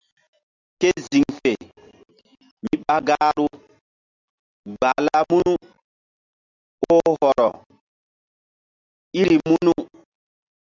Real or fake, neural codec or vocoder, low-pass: real; none; 7.2 kHz